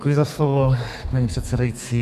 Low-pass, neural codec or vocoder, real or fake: 14.4 kHz; codec, 32 kHz, 1.9 kbps, SNAC; fake